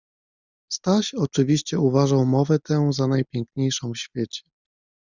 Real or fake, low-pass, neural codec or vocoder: real; 7.2 kHz; none